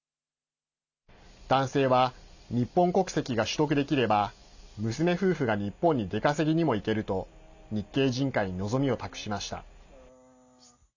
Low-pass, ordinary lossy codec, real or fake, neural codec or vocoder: 7.2 kHz; none; real; none